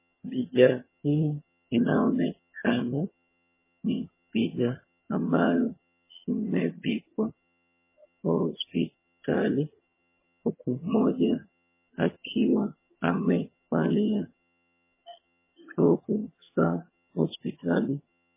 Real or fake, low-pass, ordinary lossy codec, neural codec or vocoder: fake; 3.6 kHz; MP3, 16 kbps; vocoder, 22.05 kHz, 80 mel bands, HiFi-GAN